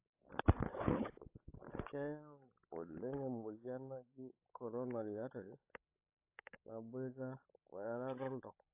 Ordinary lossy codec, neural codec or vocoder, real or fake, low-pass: AAC, 32 kbps; codec, 16 kHz, 16 kbps, FreqCodec, larger model; fake; 3.6 kHz